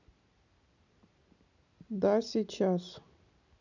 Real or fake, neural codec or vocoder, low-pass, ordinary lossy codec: real; none; 7.2 kHz; none